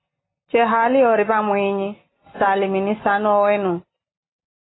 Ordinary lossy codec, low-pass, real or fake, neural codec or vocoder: AAC, 16 kbps; 7.2 kHz; real; none